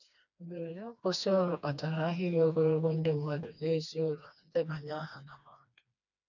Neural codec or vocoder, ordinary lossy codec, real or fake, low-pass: codec, 16 kHz, 2 kbps, FreqCodec, smaller model; none; fake; 7.2 kHz